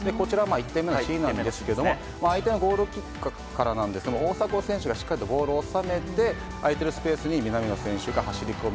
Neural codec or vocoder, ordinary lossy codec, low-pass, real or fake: none; none; none; real